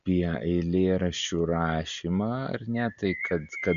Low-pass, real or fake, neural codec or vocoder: 7.2 kHz; real; none